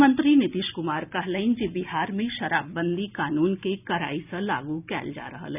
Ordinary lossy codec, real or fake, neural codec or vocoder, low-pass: none; real; none; 3.6 kHz